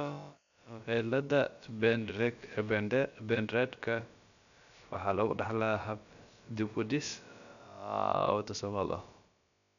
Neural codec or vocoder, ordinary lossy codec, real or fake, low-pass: codec, 16 kHz, about 1 kbps, DyCAST, with the encoder's durations; none; fake; 7.2 kHz